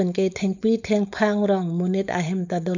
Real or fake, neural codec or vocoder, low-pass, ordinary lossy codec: fake; codec, 16 kHz, 4.8 kbps, FACodec; 7.2 kHz; none